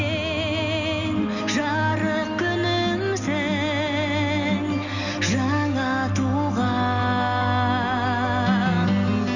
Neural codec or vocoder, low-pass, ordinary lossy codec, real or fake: none; 7.2 kHz; none; real